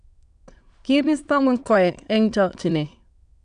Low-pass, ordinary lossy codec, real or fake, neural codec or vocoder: 9.9 kHz; none; fake; autoencoder, 22.05 kHz, a latent of 192 numbers a frame, VITS, trained on many speakers